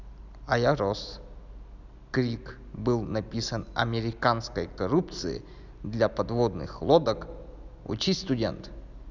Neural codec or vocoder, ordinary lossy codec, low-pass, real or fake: none; none; 7.2 kHz; real